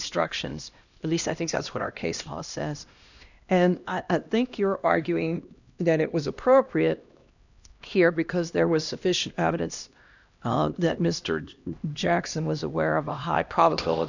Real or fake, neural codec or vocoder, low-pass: fake; codec, 16 kHz, 1 kbps, X-Codec, HuBERT features, trained on LibriSpeech; 7.2 kHz